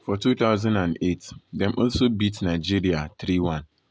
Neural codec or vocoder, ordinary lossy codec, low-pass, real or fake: none; none; none; real